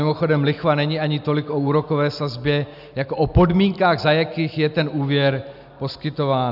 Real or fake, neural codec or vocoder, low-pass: real; none; 5.4 kHz